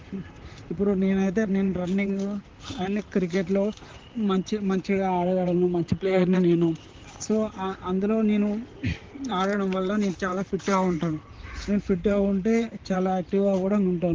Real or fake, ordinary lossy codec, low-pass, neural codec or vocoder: fake; Opus, 16 kbps; 7.2 kHz; vocoder, 44.1 kHz, 128 mel bands, Pupu-Vocoder